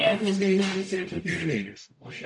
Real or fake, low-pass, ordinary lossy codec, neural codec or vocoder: fake; 10.8 kHz; AAC, 64 kbps; codec, 44.1 kHz, 0.9 kbps, DAC